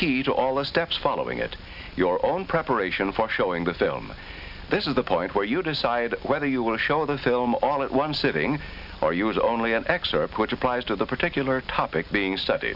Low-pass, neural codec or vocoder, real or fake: 5.4 kHz; none; real